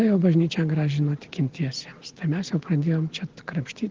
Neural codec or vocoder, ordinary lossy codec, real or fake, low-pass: none; Opus, 16 kbps; real; 7.2 kHz